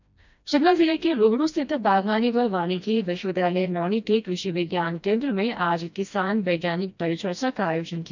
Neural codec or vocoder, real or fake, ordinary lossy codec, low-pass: codec, 16 kHz, 1 kbps, FreqCodec, smaller model; fake; none; 7.2 kHz